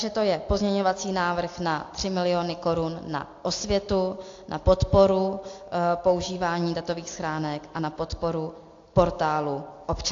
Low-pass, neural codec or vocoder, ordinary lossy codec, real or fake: 7.2 kHz; none; AAC, 48 kbps; real